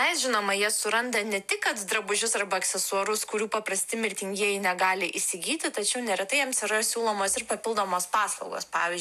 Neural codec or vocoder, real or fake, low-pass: none; real; 14.4 kHz